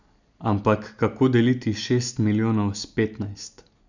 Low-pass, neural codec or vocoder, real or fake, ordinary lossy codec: 7.2 kHz; none; real; none